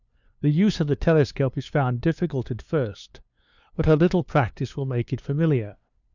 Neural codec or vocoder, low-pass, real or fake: codec, 16 kHz, 2 kbps, FunCodec, trained on LibriTTS, 25 frames a second; 7.2 kHz; fake